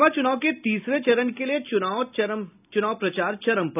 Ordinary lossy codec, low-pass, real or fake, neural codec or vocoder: none; 3.6 kHz; real; none